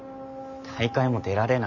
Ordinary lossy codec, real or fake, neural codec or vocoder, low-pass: none; real; none; 7.2 kHz